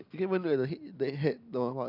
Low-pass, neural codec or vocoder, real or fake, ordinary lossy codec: 5.4 kHz; none; real; none